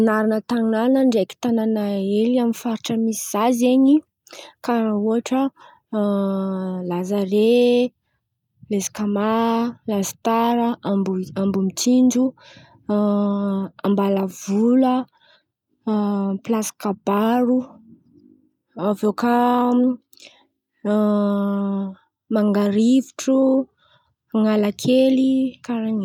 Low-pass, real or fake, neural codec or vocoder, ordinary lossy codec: 19.8 kHz; real; none; none